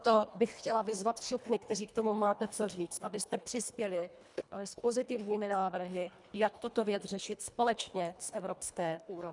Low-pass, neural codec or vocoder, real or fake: 10.8 kHz; codec, 24 kHz, 1.5 kbps, HILCodec; fake